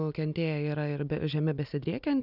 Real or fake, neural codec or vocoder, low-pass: real; none; 5.4 kHz